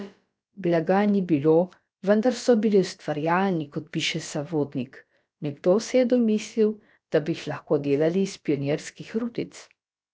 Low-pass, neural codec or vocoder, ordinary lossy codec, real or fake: none; codec, 16 kHz, about 1 kbps, DyCAST, with the encoder's durations; none; fake